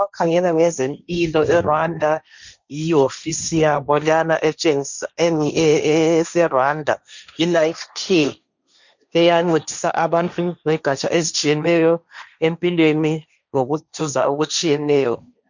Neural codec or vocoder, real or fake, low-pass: codec, 16 kHz, 1.1 kbps, Voila-Tokenizer; fake; 7.2 kHz